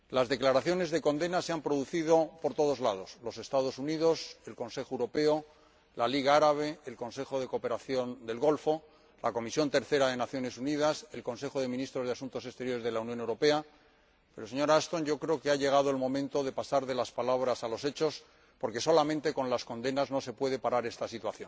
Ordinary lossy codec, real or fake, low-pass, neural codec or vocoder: none; real; none; none